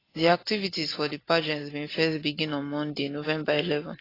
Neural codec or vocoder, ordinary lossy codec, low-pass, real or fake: none; AAC, 24 kbps; 5.4 kHz; real